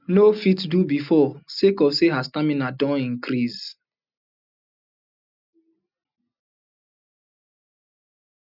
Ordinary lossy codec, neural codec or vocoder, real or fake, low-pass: none; none; real; 5.4 kHz